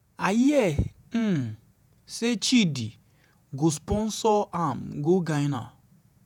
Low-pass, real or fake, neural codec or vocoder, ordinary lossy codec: 19.8 kHz; fake; vocoder, 48 kHz, 128 mel bands, Vocos; none